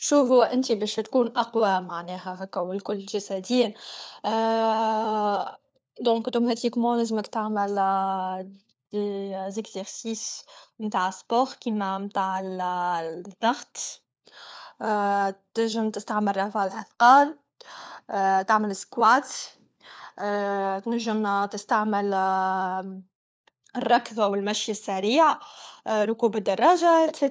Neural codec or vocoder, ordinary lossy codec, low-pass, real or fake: codec, 16 kHz, 4 kbps, FunCodec, trained on LibriTTS, 50 frames a second; none; none; fake